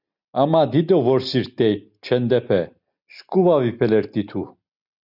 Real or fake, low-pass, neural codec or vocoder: real; 5.4 kHz; none